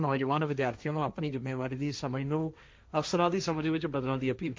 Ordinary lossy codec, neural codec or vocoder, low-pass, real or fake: none; codec, 16 kHz, 1.1 kbps, Voila-Tokenizer; none; fake